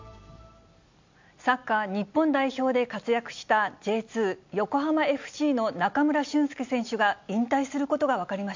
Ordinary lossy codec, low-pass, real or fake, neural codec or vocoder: MP3, 64 kbps; 7.2 kHz; real; none